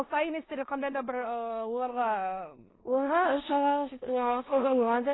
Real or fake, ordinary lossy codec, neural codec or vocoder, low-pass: fake; AAC, 16 kbps; codec, 16 kHz in and 24 kHz out, 0.9 kbps, LongCat-Audio-Codec, four codebook decoder; 7.2 kHz